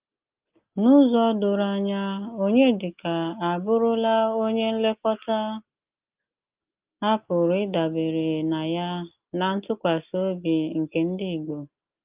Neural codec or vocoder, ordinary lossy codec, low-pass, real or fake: none; Opus, 24 kbps; 3.6 kHz; real